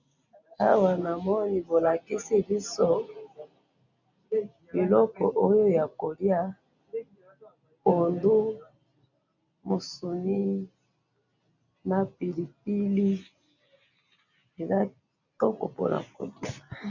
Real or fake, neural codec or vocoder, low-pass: real; none; 7.2 kHz